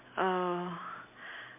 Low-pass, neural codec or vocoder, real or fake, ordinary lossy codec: 3.6 kHz; none; real; MP3, 32 kbps